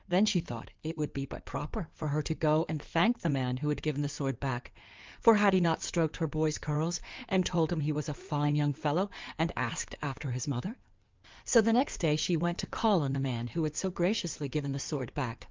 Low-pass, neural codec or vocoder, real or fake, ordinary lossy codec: 7.2 kHz; codec, 16 kHz in and 24 kHz out, 2.2 kbps, FireRedTTS-2 codec; fake; Opus, 24 kbps